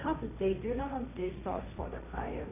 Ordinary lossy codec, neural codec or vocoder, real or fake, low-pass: none; codec, 16 kHz, 1.1 kbps, Voila-Tokenizer; fake; 3.6 kHz